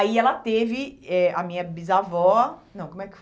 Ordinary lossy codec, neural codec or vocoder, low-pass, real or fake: none; none; none; real